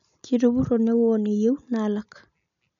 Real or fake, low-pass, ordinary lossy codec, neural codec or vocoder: real; 7.2 kHz; none; none